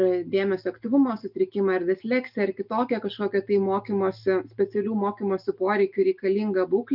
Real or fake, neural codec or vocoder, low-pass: real; none; 5.4 kHz